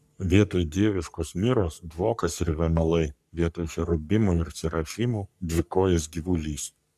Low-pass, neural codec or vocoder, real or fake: 14.4 kHz; codec, 44.1 kHz, 3.4 kbps, Pupu-Codec; fake